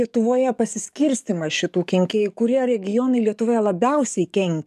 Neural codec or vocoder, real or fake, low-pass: codec, 44.1 kHz, 7.8 kbps, DAC; fake; 14.4 kHz